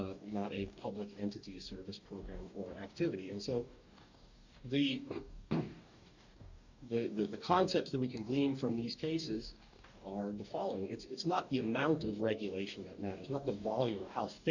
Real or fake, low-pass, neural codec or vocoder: fake; 7.2 kHz; codec, 44.1 kHz, 2.6 kbps, DAC